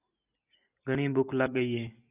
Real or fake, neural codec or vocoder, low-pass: real; none; 3.6 kHz